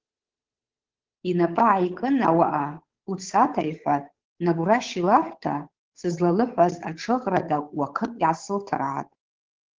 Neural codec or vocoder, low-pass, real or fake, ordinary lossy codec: codec, 16 kHz, 8 kbps, FunCodec, trained on Chinese and English, 25 frames a second; 7.2 kHz; fake; Opus, 16 kbps